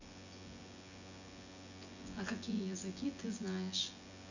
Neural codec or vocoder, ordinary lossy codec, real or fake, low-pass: vocoder, 24 kHz, 100 mel bands, Vocos; none; fake; 7.2 kHz